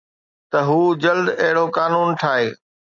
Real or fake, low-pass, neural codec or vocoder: real; 7.2 kHz; none